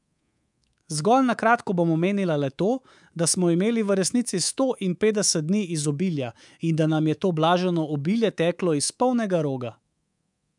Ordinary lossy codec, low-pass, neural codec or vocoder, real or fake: none; 10.8 kHz; codec, 24 kHz, 3.1 kbps, DualCodec; fake